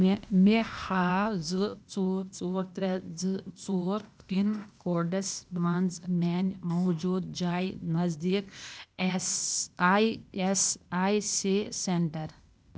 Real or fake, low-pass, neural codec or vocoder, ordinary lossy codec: fake; none; codec, 16 kHz, 0.8 kbps, ZipCodec; none